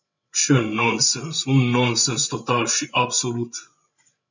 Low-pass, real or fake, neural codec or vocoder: 7.2 kHz; fake; codec, 16 kHz, 16 kbps, FreqCodec, larger model